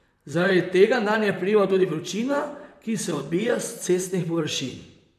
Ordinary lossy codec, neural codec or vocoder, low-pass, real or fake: none; vocoder, 44.1 kHz, 128 mel bands, Pupu-Vocoder; 14.4 kHz; fake